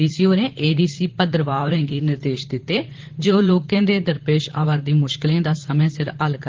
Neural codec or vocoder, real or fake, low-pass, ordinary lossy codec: vocoder, 44.1 kHz, 128 mel bands, Pupu-Vocoder; fake; 7.2 kHz; Opus, 16 kbps